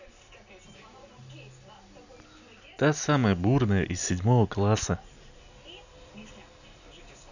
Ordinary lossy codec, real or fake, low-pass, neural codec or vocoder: none; real; 7.2 kHz; none